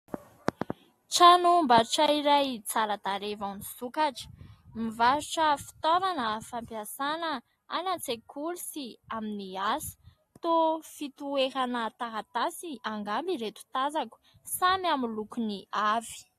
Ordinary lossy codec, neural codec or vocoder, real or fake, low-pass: AAC, 48 kbps; none; real; 14.4 kHz